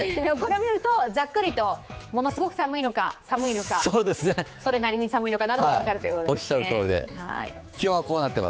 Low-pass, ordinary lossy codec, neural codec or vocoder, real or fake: none; none; codec, 16 kHz, 4 kbps, X-Codec, HuBERT features, trained on general audio; fake